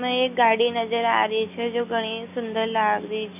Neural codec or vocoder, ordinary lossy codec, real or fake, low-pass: none; none; real; 3.6 kHz